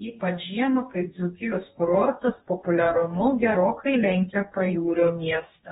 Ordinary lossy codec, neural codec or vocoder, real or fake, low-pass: AAC, 16 kbps; codec, 44.1 kHz, 2.6 kbps, DAC; fake; 19.8 kHz